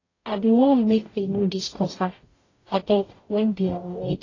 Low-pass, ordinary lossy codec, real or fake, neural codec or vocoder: 7.2 kHz; AAC, 32 kbps; fake; codec, 44.1 kHz, 0.9 kbps, DAC